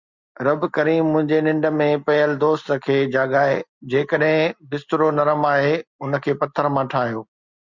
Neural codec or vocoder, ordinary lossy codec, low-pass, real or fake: none; Opus, 64 kbps; 7.2 kHz; real